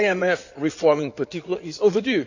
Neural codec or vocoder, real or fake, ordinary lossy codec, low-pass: codec, 16 kHz in and 24 kHz out, 2.2 kbps, FireRedTTS-2 codec; fake; none; 7.2 kHz